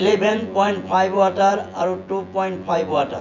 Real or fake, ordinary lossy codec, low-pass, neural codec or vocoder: fake; none; 7.2 kHz; vocoder, 24 kHz, 100 mel bands, Vocos